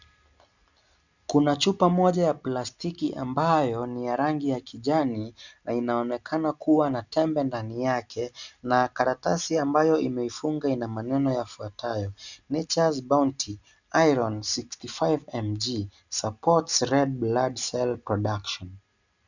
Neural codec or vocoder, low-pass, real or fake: none; 7.2 kHz; real